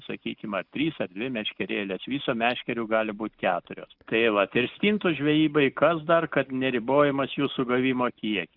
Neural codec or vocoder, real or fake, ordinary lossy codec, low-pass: none; real; Opus, 64 kbps; 5.4 kHz